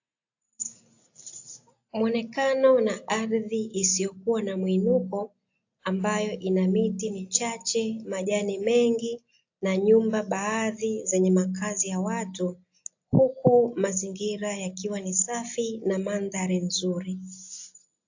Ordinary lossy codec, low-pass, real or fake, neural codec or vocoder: AAC, 48 kbps; 7.2 kHz; real; none